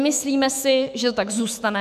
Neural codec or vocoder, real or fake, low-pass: autoencoder, 48 kHz, 128 numbers a frame, DAC-VAE, trained on Japanese speech; fake; 14.4 kHz